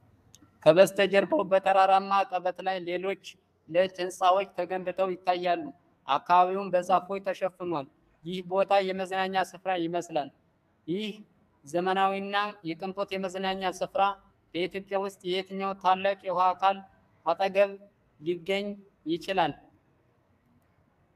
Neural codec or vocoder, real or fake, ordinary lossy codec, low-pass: codec, 44.1 kHz, 2.6 kbps, SNAC; fake; AAC, 96 kbps; 14.4 kHz